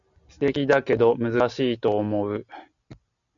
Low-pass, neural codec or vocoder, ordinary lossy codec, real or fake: 7.2 kHz; none; MP3, 96 kbps; real